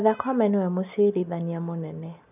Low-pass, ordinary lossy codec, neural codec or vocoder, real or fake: 3.6 kHz; none; none; real